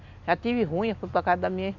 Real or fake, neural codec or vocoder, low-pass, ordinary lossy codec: real; none; 7.2 kHz; none